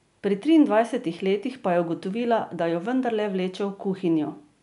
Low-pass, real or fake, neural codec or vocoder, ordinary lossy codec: 10.8 kHz; real; none; none